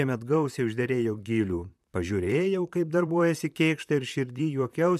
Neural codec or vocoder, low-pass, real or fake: vocoder, 44.1 kHz, 128 mel bands, Pupu-Vocoder; 14.4 kHz; fake